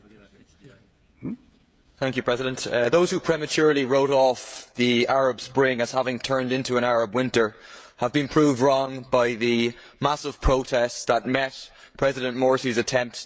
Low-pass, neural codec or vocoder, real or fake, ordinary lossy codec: none; codec, 16 kHz, 16 kbps, FreqCodec, smaller model; fake; none